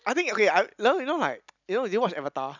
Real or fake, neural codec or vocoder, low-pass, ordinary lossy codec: real; none; 7.2 kHz; none